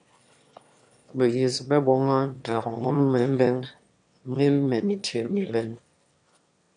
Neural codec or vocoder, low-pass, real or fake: autoencoder, 22.05 kHz, a latent of 192 numbers a frame, VITS, trained on one speaker; 9.9 kHz; fake